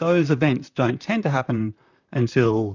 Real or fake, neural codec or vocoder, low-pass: fake; vocoder, 44.1 kHz, 128 mel bands, Pupu-Vocoder; 7.2 kHz